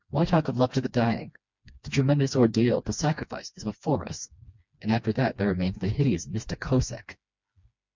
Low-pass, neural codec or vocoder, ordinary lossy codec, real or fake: 7.2 kHz; codec, 16 kHz, 2 kbps, FreqCodec, smaller model; MP3, 64 kbps; fake